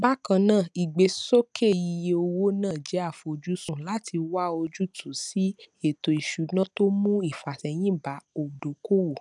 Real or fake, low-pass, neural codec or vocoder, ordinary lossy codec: real; 10.8 kHz; none; none